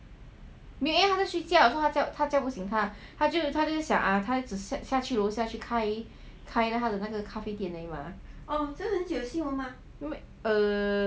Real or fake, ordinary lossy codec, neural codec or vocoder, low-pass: real; none; none; none